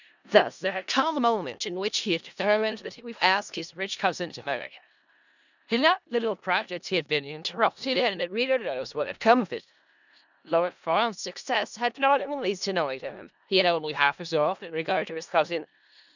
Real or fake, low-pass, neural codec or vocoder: fake; 7.2 kHz; codec, 16 kHz in and 24 kHz out, 0.4 kbps, LongCat-Audio-Codec, four codebook decoder